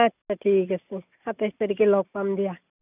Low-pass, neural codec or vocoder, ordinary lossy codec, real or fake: 3.6 kHz; none; none; real